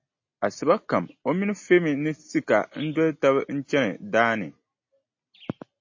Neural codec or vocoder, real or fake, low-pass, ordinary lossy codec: none; real; 7.2 kHz; MP3, 32 kbps